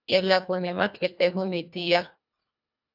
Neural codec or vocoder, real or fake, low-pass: codec, 24 kHz, 1.5 kbps, HILCodec; fake; 5.4 kHz